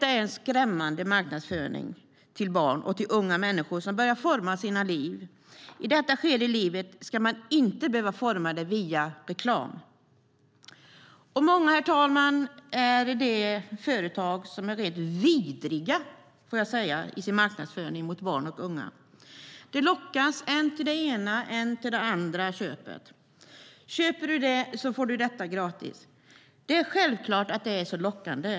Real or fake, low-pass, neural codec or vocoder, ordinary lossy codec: real; none; none; none